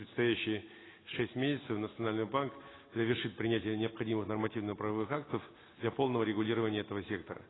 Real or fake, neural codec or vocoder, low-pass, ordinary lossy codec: real; none; 7.2 kHz; AAC, 16 kbps